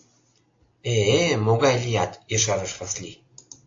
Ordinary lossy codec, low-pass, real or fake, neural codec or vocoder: AAC, 48 kbps; 7.2 kHz; real; none